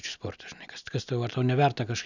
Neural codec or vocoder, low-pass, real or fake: none; 7.2 kHz; real